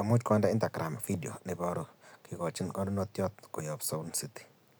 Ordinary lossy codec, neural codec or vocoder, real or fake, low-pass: none; none; real; none